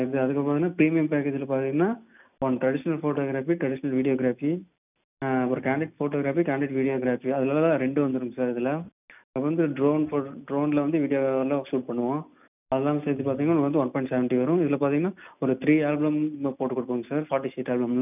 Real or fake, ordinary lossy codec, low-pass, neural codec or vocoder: real; none; 3.6 kHz; none